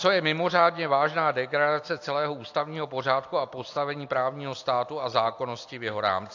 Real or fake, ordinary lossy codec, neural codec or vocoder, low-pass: real; AAC, 48 kbps; none; 7.2 kHz